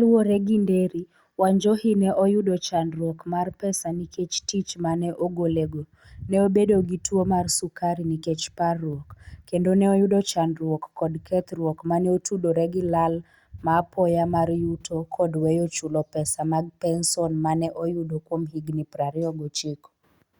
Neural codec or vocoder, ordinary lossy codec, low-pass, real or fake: none; Opus, 64 kbps; 19.8 kHz; real